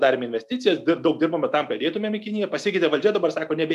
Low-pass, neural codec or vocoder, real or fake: 14.4 kHz; none; real